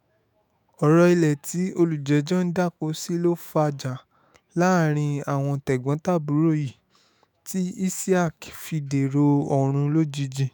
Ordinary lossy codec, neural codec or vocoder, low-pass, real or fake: none; autoencoder, 48 kHz, 128 numbers a frame, DAC-VAE, trained on Japanese speech; none; fake